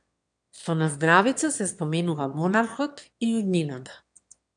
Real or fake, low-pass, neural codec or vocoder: fake; 9.9 kHz; autoencoder, 22.05 kHz, a latent of 192 numbers a frame, VITS, trained on one speaker